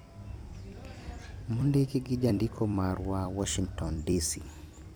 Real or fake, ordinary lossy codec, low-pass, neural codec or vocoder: fake; none; none; vocoder, 44.1 kHz, 128 mel bands every 256 samples, BigVGAN v2